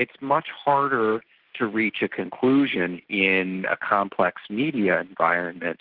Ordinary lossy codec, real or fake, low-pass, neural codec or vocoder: Opus, 16 kbps; real; 5.4 kHz; none